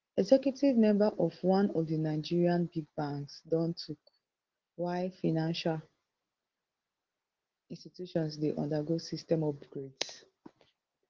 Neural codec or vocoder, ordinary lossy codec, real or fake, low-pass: none; Opus, 16 kbps; real; 7.2 kHz